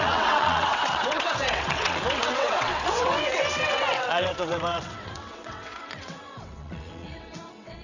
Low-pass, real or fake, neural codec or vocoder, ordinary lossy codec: 7.2 kHz; fake; vocoder, 22.05 kHz, 80 mel bands, WaveNeXt; none